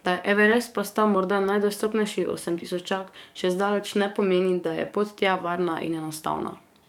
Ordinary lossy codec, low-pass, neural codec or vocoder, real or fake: none; 19.8 kHz; codec, 44.1 kHz, 7.8 kbps, DAC; fake